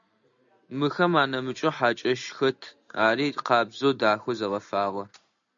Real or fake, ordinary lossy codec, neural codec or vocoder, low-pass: real; MP3, 64 kbps; none; 7.2 kHz